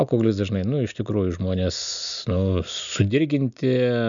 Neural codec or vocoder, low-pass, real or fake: none; 7.2 kHz; real